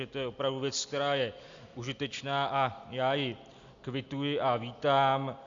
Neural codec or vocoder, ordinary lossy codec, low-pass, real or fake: none; Opus, 64 kbps; 7.2 kHz; real